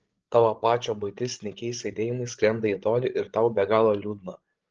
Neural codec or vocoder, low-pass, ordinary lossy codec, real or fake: codec, 16 kHz, 16 kbps, FreqCodec, larger model; 7.2 kHz; Opus, 16 kbps; fake